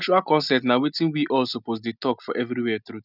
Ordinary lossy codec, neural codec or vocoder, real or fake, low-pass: none; none; real; 5.4 kHz